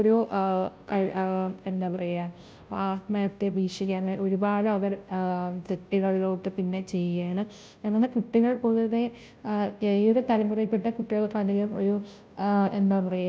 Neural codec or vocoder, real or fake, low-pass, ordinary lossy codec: codec, 16 kHz, 0.5 kbps, FunCodec, trained on Chinese and English, 25 frames a second; fake; none; none